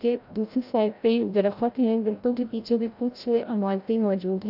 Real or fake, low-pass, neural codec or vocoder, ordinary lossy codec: fake; 5.4 kHz; codec, 16 kHz, 0.5 kbps, FreqCodec, larger model; AAC, 48 kbps